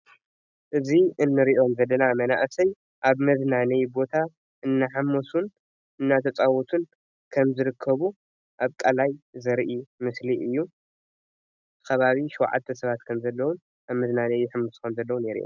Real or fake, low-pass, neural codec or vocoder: real; 7.2 kHz; none